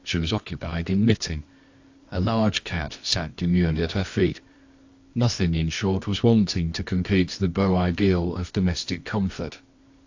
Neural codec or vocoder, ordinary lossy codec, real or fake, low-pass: codec, 24 kHz, 0.9 kbps, WavTokenizer, medium music audio release; AAC, 48 kbps; fake; 7.2 kHz